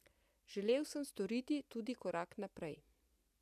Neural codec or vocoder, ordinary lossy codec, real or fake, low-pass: none; none; real; 14.4 kHz